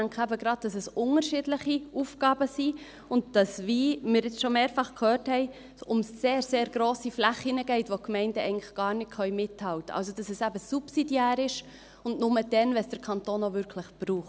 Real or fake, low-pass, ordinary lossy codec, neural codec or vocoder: real; none; none; none